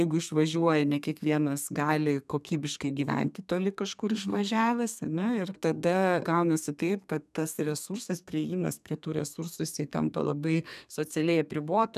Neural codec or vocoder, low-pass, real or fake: codec, 32 kHz, 1.9 kbps, SNAC; 14.4 kHz; fake